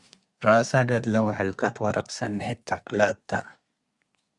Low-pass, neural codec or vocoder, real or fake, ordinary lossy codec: 10.8 kHz; codec, 24 kHz, 1 kbps, SNAC; fake; Opus, 64 kbps